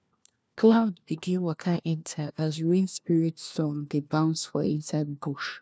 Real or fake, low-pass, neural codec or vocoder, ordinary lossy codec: fake; none; codec, 16 kHz, 1 kbps, FunCodec, trained on LibriTTS, 50 frames a second; none